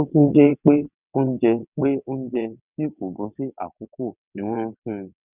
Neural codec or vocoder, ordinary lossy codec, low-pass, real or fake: vocoder, 22.05 kHz, 80 mel bands, WaveNeXt; none; 3.6 kHz; fake